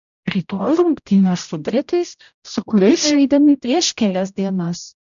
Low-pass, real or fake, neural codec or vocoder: 7.2 kHz; fake; codec, 16 kHz, 0.5 kbps, X-Codec, HuBERT features, trained on general audio